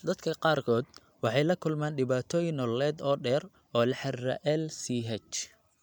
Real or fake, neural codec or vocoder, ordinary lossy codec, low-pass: real; none; none; 19.8 kHz